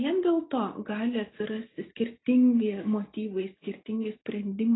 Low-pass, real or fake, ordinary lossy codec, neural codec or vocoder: 7.2 kHz; real; AAC, 16 kbps; none